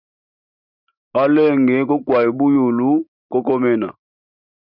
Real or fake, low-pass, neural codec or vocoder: real; 5.4 kHz; none